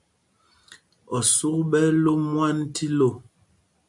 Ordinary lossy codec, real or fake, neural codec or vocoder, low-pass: MP3, 64 kbps; real; none; 10.8 kHz